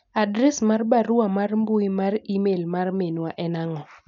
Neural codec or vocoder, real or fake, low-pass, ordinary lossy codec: none; real; 7.2 kHz; none